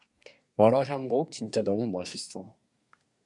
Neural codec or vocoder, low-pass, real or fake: codec, 24 kHz, 1 kbps, SNAC; 10.8 kHz; fake